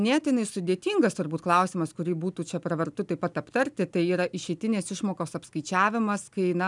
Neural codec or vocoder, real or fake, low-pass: none; real; 10.8 kHz